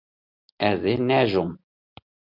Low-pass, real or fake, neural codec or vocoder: 5.4 kHz; real; none